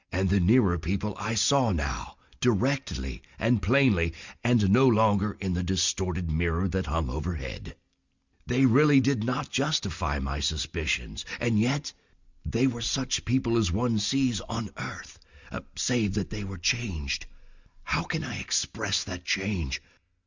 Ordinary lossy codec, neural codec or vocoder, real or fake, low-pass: Opus, 64 kbps; none; real; 7.2 kHz